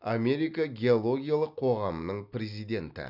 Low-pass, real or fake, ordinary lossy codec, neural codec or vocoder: 5.4 kHz; real; none; none